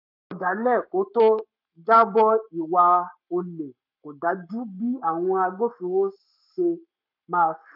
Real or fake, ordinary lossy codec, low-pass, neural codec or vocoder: fake; none; 5.4 kHz; codec, 44.1 kHz, 7.8 kbps, Pupu-Codec